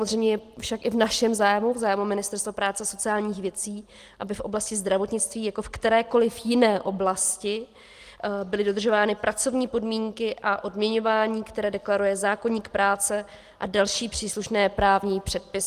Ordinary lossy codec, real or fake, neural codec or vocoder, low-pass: Opus, 24 kbps; real; none; 14.4 kHz